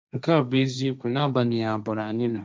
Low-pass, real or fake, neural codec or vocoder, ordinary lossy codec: none; fake; codec, 16 kHz, 1.1 kbps, Voila-Tokenizer; none